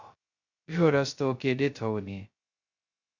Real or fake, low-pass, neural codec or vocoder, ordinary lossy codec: fake; 7.2 kHz; codec, 16 kHz, 0.2 kbps, FocalCodec; Opus, 64 kbps